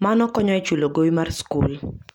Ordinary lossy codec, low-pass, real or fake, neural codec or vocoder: MP3, 96 kbps; 19.8 kHz; real; none